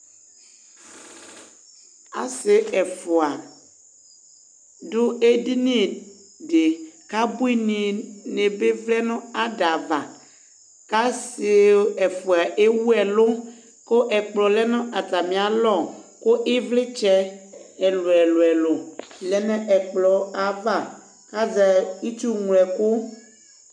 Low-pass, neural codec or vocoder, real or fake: 9.9 kHz; none; real